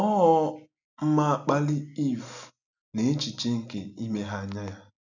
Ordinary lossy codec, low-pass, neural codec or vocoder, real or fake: none; 7.2 kHz; none; real